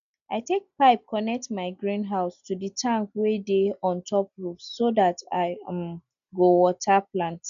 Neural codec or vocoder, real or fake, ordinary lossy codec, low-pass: none; real; AAC, 96 kbps; 7.2 kHz